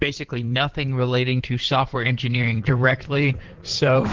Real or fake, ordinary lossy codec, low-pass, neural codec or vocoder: fake; Opus, 16 kbps; 7.2 kHz; codec, 16 kHz in and 24 kHz out, 2.2 kbps, FireRedTTS-2 codec